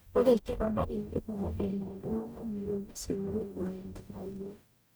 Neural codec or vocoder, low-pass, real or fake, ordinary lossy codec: codec, 44.1 kHz, 0.9 kbps, DAC; none; fake; none